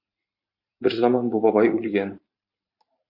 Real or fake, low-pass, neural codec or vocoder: fake; 5.4 kHz; vocoder, 44.1 kHz, 128 mel bands every 512 samples, BigVGAN v2